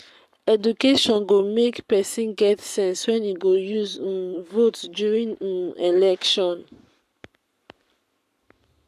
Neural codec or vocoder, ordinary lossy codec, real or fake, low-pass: codec, 44.1 kHz, 7.8 kbps, Pupu-Codec; none; fake; 14.4 kHz